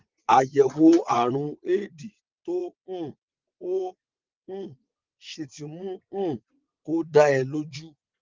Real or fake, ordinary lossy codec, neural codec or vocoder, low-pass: fake; Opus, 32 kbps; vocoder, 22.05 kHz, 80 mel bands, WaveNeXt; 7.2 kHz